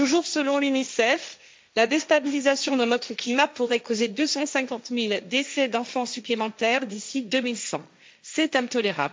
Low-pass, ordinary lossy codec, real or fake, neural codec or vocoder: none; none; fake; codec, 16 kHz, 1.1 kbps, Voila-Tokenizer